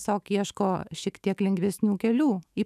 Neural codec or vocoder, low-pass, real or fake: autoencoder, 48 kHz, 128 numbers a frame, DAC-VAE, trained on Japanese speech; 14.4 kHz; fake